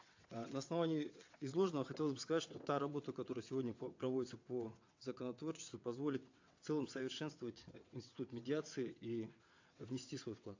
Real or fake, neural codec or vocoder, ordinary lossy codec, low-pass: fake; vocoder, 22.05 kHz, 80 mel bands, WaveNeXt; AAC, 48 kbps; 7.2 kHz